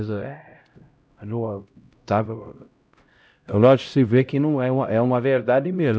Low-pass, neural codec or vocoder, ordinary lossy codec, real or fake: none; codec, 16 kHz, 0.5 kbps, X-Codec, HuBERT features, trained on LibriSpeech; none; fake